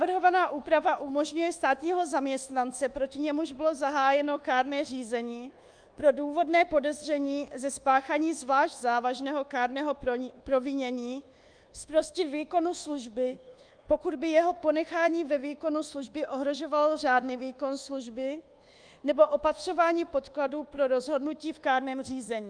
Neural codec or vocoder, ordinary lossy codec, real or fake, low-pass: codec, 24 kHz, 1.2 kbps, DualCodec; Opus, 32 kbps; fake; 9.9 kHz